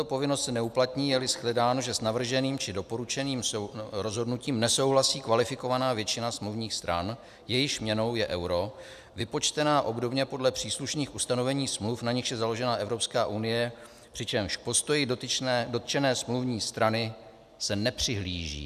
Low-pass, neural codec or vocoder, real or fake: 14.4 kHz; none; real